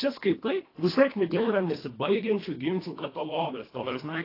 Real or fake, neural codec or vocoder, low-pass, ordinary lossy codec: fake; codec, 24 kHz, 1.5 kbps, HILCodec; 5.4 kHz; AAC, 24 kbps